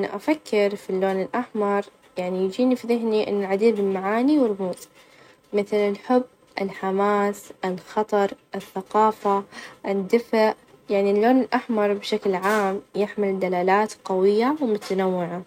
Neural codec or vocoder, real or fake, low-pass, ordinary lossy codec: none; real; 19.8 kHz; none